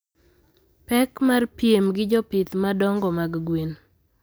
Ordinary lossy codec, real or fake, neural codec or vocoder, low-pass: none; real; none; none